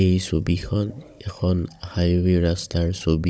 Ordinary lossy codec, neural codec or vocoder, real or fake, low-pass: none; codec, 16 kHz, 16 kbps, FunCodec, trained on Chinese and English, 50 frames a second; fake; none